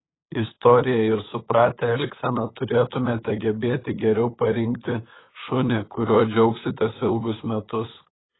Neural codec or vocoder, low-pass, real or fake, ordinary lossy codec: codec, 16 kHz, 8 kbps, FunCodec, trained on LibriTTS, 25 frames a second; 7.2 kHz; fake; AAC, 16 kbps